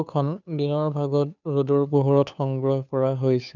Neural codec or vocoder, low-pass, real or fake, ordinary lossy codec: codec, 16 kHz, 2 kbps, FunCodec, trained on Chinese and English, 25 frames a second; 7.2 kHz; fake; none